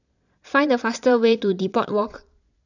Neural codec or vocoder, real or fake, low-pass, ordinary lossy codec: vocoder, 22.05 kHz, 80 mel bands, Vocos; fake; 7.2 kHz; none